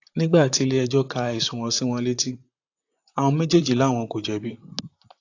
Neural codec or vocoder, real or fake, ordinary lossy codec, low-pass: vocoder, 44.1 kHz, 128 mel bands, Pupu-Vocoder; fake; AAC, 48 kbps; 7.2 kHz